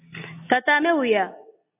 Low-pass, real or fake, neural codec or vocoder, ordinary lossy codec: 3.6 kHz; real; none; AAC, 32 kbps